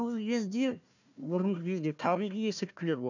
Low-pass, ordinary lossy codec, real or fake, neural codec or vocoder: 7.2 kHz; none; fake; codec, 16 kHz, 1 kbps, FunCodec, trained on Chinese and English, 50 frames a second